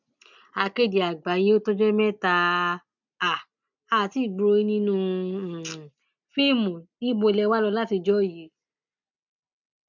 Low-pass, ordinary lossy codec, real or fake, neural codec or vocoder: 7.2 kHz; none; real; none